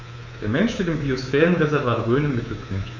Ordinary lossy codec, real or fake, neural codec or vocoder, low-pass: none; fake; codec, 24 kHz, 3.1 kbps, DualCodec; 7.2 kHz